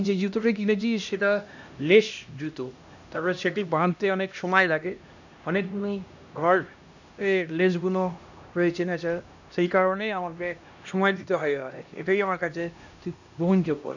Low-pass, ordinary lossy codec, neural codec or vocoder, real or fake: 7.2 kHz; none; codec, 16 kHz, 1 kbps, X-Codec, HuBERT features, trained on LibriSpeech; fake